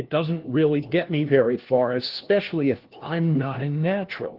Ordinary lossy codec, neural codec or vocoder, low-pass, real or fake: Opus, 16 kbps; codec, 16 kHz, 1 kbps, FunCodec, trained on LibriTTS, 50 frames a second; 5.4 kHz; fake